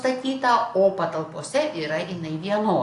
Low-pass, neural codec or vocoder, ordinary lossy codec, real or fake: 10.8 kHz; none; AAC, 48 kbps; real